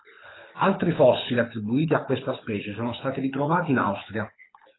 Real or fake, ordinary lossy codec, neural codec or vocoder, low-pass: fake; AAC, 16 kbps; codec, 24 kHz, 6 kbps, HILCodec; 7.2 kHz